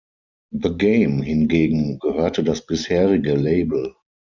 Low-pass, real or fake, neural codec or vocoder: 7.2 kHz; real; none